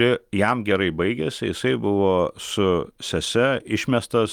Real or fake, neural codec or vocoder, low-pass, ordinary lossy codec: real; none; 19.8 kHz; Opus, 32 kbps